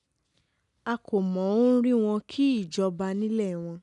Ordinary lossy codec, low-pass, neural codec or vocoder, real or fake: none; 10.8 kHz; none; real